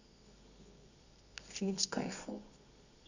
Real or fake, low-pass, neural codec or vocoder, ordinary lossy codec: fake; 7.2 kHz; codec, 24 kHz, 0.9 kbps, WavTokenizer, medium music audio release; none